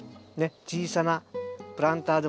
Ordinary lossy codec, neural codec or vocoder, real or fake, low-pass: none; none; real; none